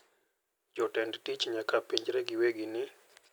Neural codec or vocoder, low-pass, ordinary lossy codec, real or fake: none; none; none; real